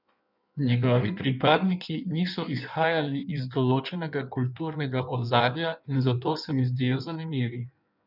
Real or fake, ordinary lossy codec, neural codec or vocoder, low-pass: fake; none; codec, 16 kHz in and 24 kHz out, 1.1 kbps, FireRedTTS-2 codec; 5.4 kHz